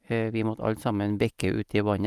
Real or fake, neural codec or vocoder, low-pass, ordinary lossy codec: real; none; 14.4 kHz; Opus, 32 kbps